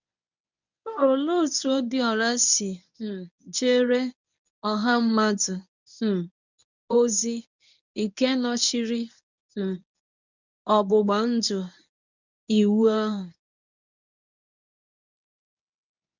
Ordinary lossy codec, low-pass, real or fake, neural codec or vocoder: none; 7.2 kHz; fake; codec, 24 kHz, 0.9 kbps, WavTokenizer, medium speech release version 1